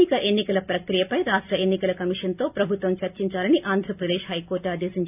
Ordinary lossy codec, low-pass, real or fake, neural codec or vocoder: none; 3.6 kHz; real; none